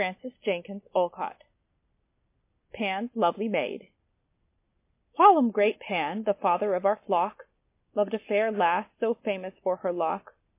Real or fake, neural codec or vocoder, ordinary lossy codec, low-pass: real; none; MP3, 24 kbps; 3.6 kHz